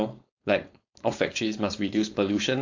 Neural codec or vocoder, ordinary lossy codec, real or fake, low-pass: codec, 16 kHz, 4.8 kbps, FACodec; AAC, 48 kbps; fake; 7.2 kHz